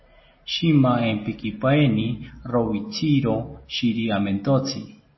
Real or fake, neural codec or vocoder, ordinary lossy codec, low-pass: real; none; MP3, 24 kbps; 7.2 kHz